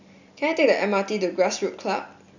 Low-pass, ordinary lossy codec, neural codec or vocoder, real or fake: 7.2 kHz; none; none; real